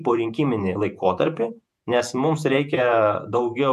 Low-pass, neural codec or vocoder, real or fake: 14.4 kHz; vocoder, 48 kHz, 128 mel bands, Vocos; fake